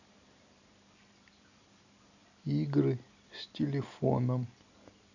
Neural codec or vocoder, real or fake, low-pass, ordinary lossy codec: none; real; 7.2 kHz; none